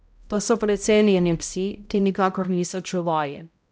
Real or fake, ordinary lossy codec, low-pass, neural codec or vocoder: fake; none; none; codec, 16 kHz, 0.5 kbps, X-Codec, HuBERT features, trained on balanced general audio